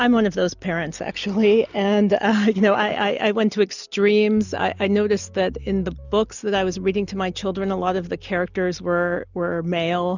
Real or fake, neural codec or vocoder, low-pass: real; none; 7.2 kHz